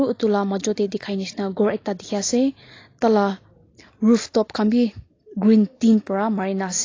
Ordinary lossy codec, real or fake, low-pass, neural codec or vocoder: AAC, 32 kbps; real; 7.2 kHz; none